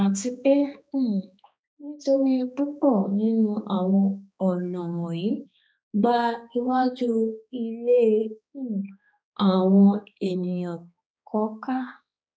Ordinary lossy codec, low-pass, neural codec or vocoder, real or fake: none; none; codec, 16 kHz, 2 kbps, X-Codec, HuBERT features, trained on balanced general audio; fake